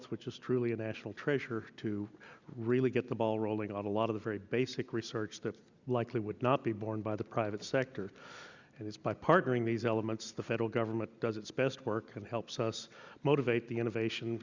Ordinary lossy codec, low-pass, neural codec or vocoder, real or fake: Opus, 64 kbps; 7.2 kHz; none; real